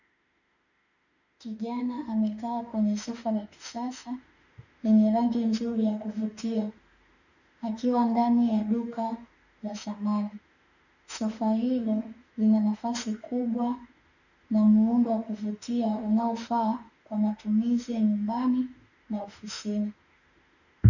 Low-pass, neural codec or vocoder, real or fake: 7.2 kHz; autoencoder, 48 kHz, 32 numbers a frame, DAC-VAE, trained on Japanese speech; fake